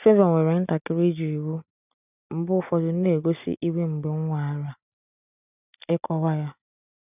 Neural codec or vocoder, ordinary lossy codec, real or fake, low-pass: none; none; real; 3.6 kHz